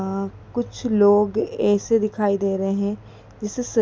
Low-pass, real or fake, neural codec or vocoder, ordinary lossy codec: none; real; none; none